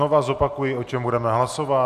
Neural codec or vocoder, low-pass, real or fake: none; 14.4 kHz; real